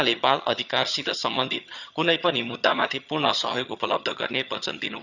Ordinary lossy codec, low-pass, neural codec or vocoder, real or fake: none; 7.2 kHz; vocoder, 22.05 kHz, 80 mel bands, HiFi-GAN; fake